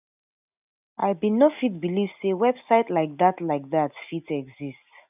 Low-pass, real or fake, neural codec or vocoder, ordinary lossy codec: 3.6 kHz; real; none; none